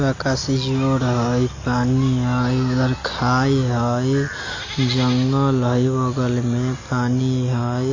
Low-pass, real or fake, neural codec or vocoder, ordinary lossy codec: 7.2 kHz; real; none; AAC, 32 kbps